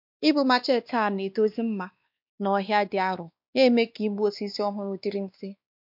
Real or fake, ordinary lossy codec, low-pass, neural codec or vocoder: fake; MP3, 48 kbps; 5.4 kHz; codec, 16 kHz, 2 kbps, X-Codec, WavLM features, trained on Multilingual LibriSpeech